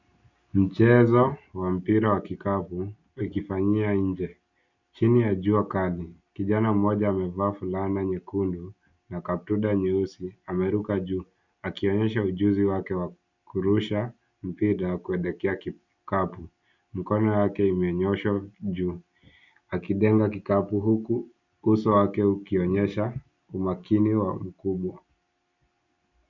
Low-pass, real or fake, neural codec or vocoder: 7.2 kHz; real; none